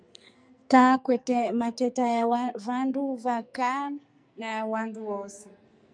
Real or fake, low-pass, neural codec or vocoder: fake; 9.9 kHz; codec, 44.1 kHz, 2.6 kbps, SNAC